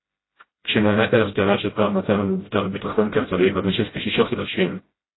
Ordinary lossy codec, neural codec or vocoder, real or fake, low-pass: AAC, 16 kbps; codec, 16 kHz, 0.5 kbps, FreqCodec, smaller model; fake; 7.2 kHz